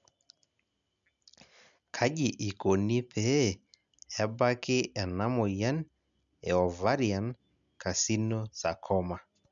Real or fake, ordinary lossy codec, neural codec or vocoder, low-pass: real; none; none; 7.2 kHz